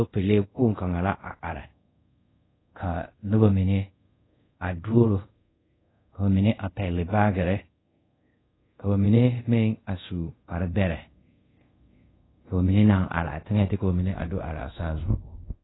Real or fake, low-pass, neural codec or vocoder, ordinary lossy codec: fake; 7.2 kHz; codec, 24 kHz, 0.5 kbps, DualCodec; AAC, 16 kbps